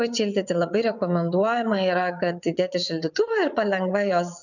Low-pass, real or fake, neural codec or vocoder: 7.2 kHz; fake; vocoder, 22.05 kHz, 80 mel bands, WaveNeXt